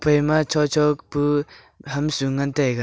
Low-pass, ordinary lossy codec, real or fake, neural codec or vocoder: none; none; real; none